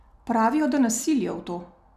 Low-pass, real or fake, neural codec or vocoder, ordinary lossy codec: 14.4 kHz; real; none; none